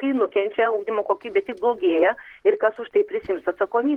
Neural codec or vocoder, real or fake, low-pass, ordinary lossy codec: vocoder, 44.1 kHz, 128 mel bands, Pupu-Vocoder; fake; 19.8 kHz; Opus, 16 kbps